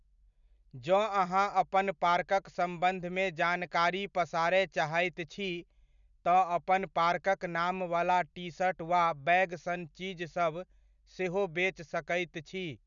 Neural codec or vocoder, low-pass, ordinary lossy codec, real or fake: none; 7.2 kHz; none; real